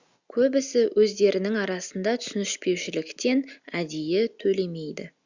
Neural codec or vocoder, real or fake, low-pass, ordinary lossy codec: none; real; 7.2 kHz; Opus, 64 kbps